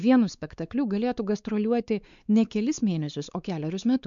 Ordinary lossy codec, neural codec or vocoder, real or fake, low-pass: MP3, 96 kbps; codec, 16 kHz, 4 kbps, X-Codec, WavLM features, trained on Multilingual LibriSpeech; fake; 7.2 kHz